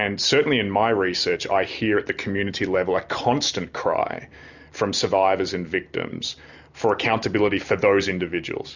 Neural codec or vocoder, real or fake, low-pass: none; real; 7.2 kHz